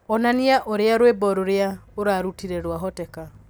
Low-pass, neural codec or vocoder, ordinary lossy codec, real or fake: none; none; none; real